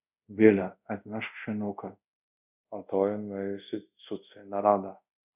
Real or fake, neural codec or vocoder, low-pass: fake; codec, 24 kHz, 0.5 kbps, DualCodec; 3.6 kHz